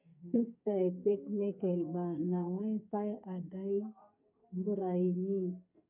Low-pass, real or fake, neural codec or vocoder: 3.6 kHz; fake; codec, 44.1 kHz, 2.6 kbps, SNAC